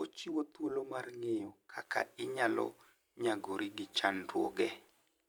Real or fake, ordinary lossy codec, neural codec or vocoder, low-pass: fake; none; vocoder, 44.1 kHz, 128 mel bands every 512 samples, BigVGAN v2; none